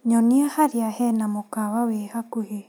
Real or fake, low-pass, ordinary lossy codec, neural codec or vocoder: real; none; none; none